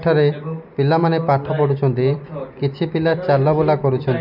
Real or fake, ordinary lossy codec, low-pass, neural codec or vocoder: real; none; 5.4 kHz; none